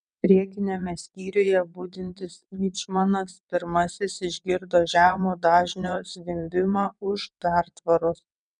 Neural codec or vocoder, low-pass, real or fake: vocoder, 22.05 kHz, 80 mel bands, WaveNeXt; 9.9 kHz; fake